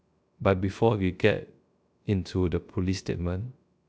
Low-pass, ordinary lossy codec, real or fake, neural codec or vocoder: none; none; fake; codec, 16 kHz, 0.3 kbps, FocalCodec